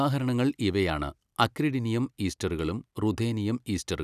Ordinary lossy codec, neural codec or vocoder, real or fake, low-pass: none; none; real; 14.4 kHz